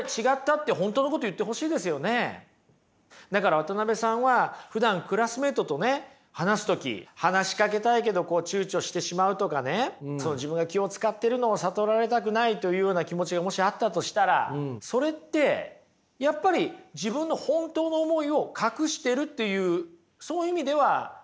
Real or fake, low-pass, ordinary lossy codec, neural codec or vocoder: real; none; none; none